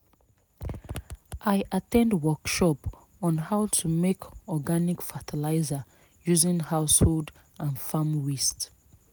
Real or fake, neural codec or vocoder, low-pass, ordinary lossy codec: real; none; none; none